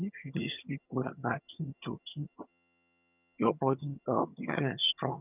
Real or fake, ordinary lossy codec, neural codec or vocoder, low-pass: fake; none; vocoder, 22.05 kHz, 80 mel bands, HiFi-GAN; 3.6 kHz